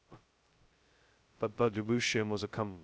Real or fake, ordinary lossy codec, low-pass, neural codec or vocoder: fake; none; none; codec, 16 kHz, 0.2 kbps, FocalCodec